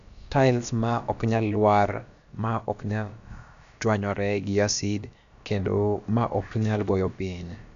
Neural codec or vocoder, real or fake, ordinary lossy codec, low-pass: codec, 16 kHz, about 1 kbps, DyCAST, with the encoder's durations; fake; none; 7.2 kHz